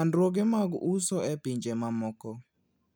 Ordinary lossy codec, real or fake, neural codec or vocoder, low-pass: none; real; none; none